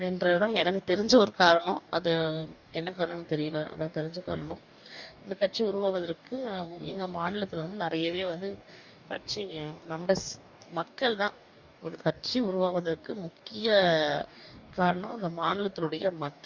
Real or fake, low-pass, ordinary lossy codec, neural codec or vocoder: fake; 7.2 kHz; Opus, 64 kbps; codec, 44.1 kHz, 2.6 kbps, DAC